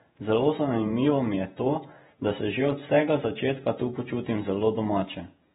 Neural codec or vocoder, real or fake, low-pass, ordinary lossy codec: vocoder, 48 kHz, 128 mel bands, Vocos; fake; 19.8 kHz; AAC, 16 kbps